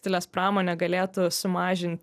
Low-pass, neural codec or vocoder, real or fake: 14.4 kHz; none; real